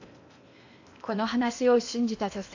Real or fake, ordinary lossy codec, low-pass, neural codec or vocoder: fake; AAC, 48 kbps; 7.2 kHz; codec, 16 kHz in and 24 kHz out, 0.8 kbps, FocalCodec, streaming, 65536 codes